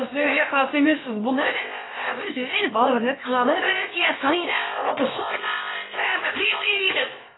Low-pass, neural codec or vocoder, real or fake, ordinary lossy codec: 7.2 kHz; codec, 16 kHz, about 1 kbps, DyCAST, with the encoder's durations; fake; AAC, 16 kbps